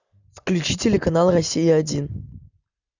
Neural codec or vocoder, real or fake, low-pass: none; real; 7.2 kHz